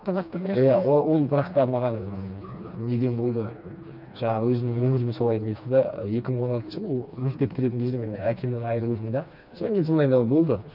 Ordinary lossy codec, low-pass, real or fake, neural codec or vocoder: none; 5.4 kHz; fake; codec, 16 kHz, 2 kbps, FreqCodec, smaller model